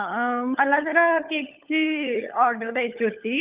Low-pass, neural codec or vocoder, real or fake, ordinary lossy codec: 3.6 kHz; codec, 16 kHz, 16 kbps, FunCodec, trained on LibriTTS, 50 frames a second; fake; Opus, 32 kbps